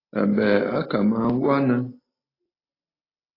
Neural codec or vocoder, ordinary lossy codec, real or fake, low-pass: none; AAC, 24 kbps; real; 5.4 kHz